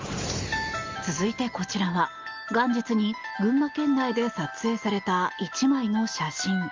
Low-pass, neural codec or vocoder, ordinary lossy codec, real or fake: 7.2 kHz; none; Opus, 32 kbps; real